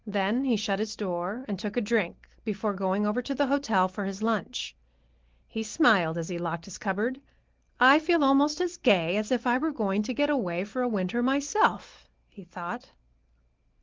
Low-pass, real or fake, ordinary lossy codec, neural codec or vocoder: 7.2 kHz; real; Opus, 16 kbps; none